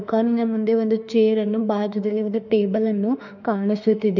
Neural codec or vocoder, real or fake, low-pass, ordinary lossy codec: autoencoder, 48 kHz, 32 numbers a frame, DAC-VAE, trained on Japanese speech; fake; 7.2 kHz; none